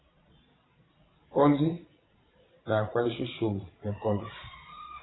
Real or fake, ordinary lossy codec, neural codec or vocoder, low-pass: fake; AAC, 16 kbps; vocoder, 22.05 kHz, 80 mel bands, Vocos; 7.2 kHz